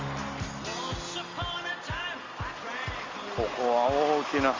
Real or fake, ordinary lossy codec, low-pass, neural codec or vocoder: real; Opus, 32 kbps; 7.2 kHz; none